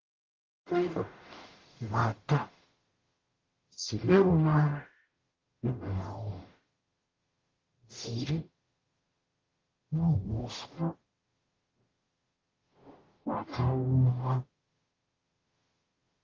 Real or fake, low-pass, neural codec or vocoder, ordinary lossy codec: fake; 7.2 kHz; codec, 44.1 kHz, 0.9 kbps, DAC; Opus, 16 kbps